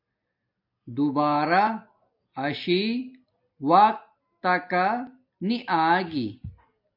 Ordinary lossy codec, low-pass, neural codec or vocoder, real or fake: MP3, 48 kbps; 5.4 kHz; none; real